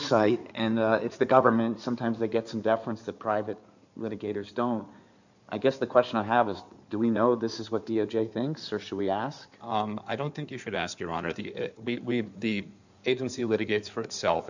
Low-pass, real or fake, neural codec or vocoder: 7.2 kHz; fake; codec, 16 kHz in and 24 kHz out, 2.2 kbps, FireRedTTS-2 codec